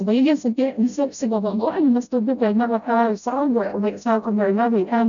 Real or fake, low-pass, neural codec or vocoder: fake; 7.2 kHz; codec, 16 kHz, 0.5 kbps, FreqCodec, smaller model